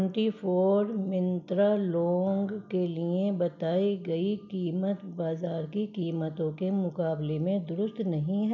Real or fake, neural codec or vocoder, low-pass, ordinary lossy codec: real; none; 7.2 kHz; none